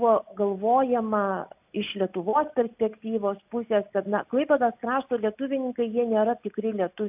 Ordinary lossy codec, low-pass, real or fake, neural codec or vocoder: AAC, 32 kbps; 3.6 kHz; real; none